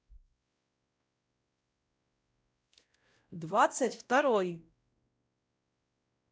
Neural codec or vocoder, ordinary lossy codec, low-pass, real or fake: codec, 16 kHz, 0.5 kbps, X-Codec, WavLM features, trained on Multilingual LibriSpeech; none; none; fake